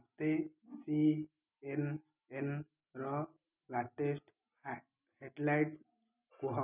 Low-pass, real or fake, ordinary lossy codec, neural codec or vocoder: 3.6 kHz; real; none; none